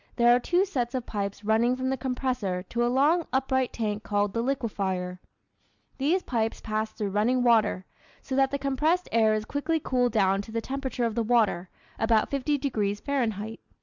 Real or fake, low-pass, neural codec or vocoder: real; 7.2 kHz; none